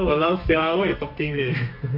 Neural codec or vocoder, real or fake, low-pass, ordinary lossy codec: codec, 32 kHz, 1.9 kbps, SNAC; fake; 5.4 kHz; MP3, 48 kbps